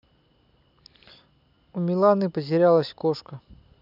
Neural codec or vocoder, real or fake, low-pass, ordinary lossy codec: none; real; 5.4 kHz; none